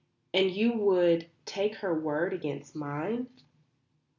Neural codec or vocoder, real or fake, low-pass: none; real; 7.2 kHz